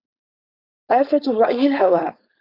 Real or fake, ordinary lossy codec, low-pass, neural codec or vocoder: fake; Opus, 64 kbps; 5.4 kHz; codec, 16 kHz, 4.8 kbps, FACodec